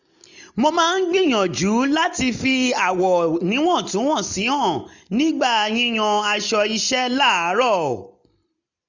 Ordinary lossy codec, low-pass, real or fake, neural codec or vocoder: none; 7.2 kHz; real; none